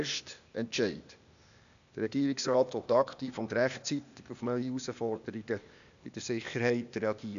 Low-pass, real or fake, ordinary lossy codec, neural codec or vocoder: 7.2 kHz; fake; MP3, 96 kbps; codec, 16 kHz, 0.8 kbps, ZipCodec